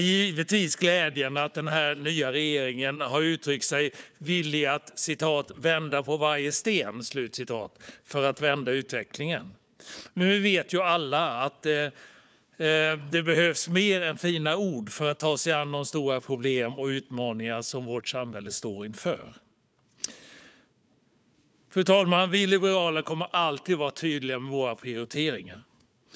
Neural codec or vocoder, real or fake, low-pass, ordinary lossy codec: codec, 16 kHz, 4 kbps, FunCodec, trained on Chinese and English, 50 frames a second; fake; none; none